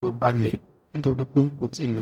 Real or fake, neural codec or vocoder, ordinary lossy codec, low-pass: fake; codec, 44.1 kHz, 0.9 kbps, DAC; none; 19.8 kHz